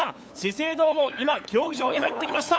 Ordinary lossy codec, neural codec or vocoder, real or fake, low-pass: none; codec, 16 kHz, 8 kbps, FunCodec, trained on LibriTTS, 25 frames a second; fake; none